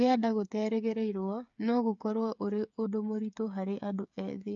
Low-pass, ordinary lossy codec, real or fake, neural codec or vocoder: 7.2 kHz; AAC, 64 kbps; fake; codec, 16 kHz, 8 kbps, FreqCodec, smaller model